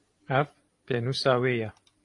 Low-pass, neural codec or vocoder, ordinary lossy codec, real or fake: 10.8 kHz; vocoder, 44.1 kHz, 128 mel bands every 512 samples, BigVGAN v2; AAC, 48 kbps; fake